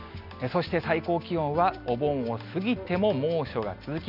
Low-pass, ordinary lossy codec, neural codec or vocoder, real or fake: 5.4 kHz; none; none; real